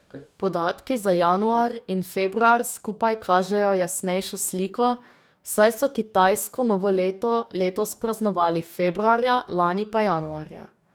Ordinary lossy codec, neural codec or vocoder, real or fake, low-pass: none; codec, 44.1 kHz, 2.6 kbps, DAC; fake; none